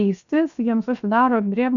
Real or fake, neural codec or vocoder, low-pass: fake; codec, 16 kHz, 0.7 kbps, FocalCodec; 7.2 kHz